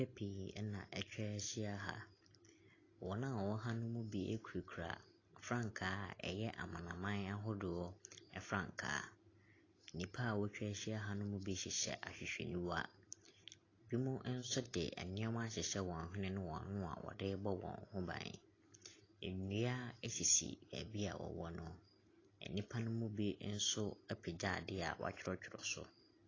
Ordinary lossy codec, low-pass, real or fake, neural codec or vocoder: AAC, 32 kbps; 7.2 kHz; real; none